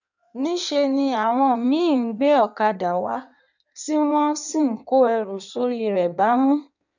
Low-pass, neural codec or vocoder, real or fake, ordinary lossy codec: 7.2 kHz; codec, 16 kHz in and 24 kHz out, 1.1 kbps, FireRedTTS-2 codec; fake; none